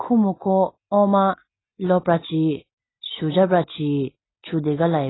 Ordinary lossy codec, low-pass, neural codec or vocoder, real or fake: AAC, 16 kbps; 7.2 kHz; none; real